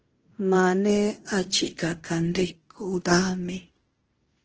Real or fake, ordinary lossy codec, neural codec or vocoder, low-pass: fake; Opus, 16 kbps; codec, 16 kHz in and 24 kHz out, 0.9 kbps, LongCat-Audio-Codec, fine tuned four codebook decoder; 7.2 kHz